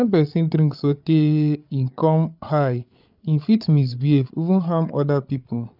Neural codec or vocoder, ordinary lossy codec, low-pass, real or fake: codec, 16 kHz, 8 kbps, FreqCodec, larger model; none; 5.4 kHz; fake